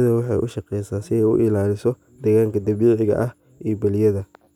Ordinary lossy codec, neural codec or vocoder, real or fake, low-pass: none; none; real; 19.8 kHz